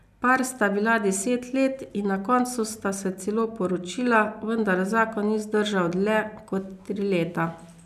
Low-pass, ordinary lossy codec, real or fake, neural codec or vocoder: 14.4 kHz; none; real; none